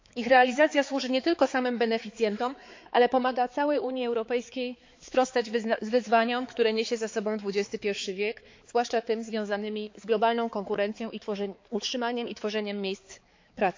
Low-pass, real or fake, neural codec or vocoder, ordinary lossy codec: 7.2 kHz; fake; codec, 16 kHz, 4 kbps, X-Codec, HuBERT features, trained on balanced general audio; MP3, 48 kbps